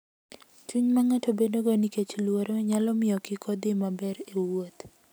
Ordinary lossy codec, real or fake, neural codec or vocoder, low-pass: none; real; none; none